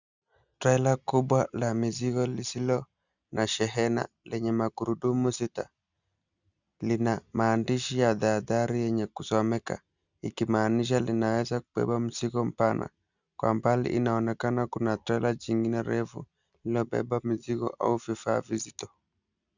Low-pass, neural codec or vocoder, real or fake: 7.2 kHz; none; real